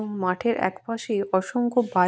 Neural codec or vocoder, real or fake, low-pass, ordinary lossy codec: none; real; none; none